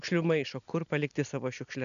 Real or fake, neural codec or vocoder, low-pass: real; none; 7.2 kHz